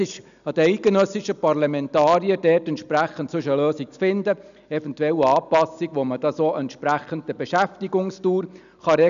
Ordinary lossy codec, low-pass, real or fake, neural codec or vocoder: none; 7.2 kHz; real; none